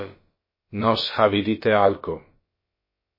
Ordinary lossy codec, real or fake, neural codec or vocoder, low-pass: MP3, 24 kbps; fake; codec, 16 kHz, about 1 kbps, DyCAST, with the encoder's durations; 5.4 kHz